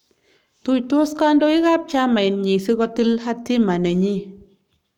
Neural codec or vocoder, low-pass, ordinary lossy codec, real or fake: codec, 44.1 kHz, 7.8 kbps, Pupu-Codec; 19.8 kHz; none; fake